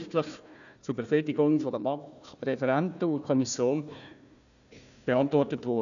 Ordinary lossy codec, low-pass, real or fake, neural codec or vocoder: none; 7.2 kHz; fake; codec, 16 kHz, 1 kbps, FunCodec, trained on Chinese and English, 50 frames a second